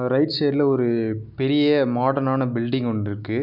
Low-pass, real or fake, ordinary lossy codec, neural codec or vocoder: 5.4 kHz; real; none; none